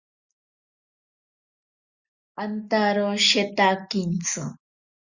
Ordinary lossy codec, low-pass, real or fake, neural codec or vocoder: Opus, 64 kbps; 7.2 kHz; real; none